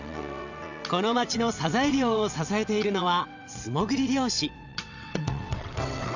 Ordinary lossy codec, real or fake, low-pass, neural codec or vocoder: none; fake; 7.2 kHz; vocoder, 22.05 kHz, 80 mel bands, WaveNeXt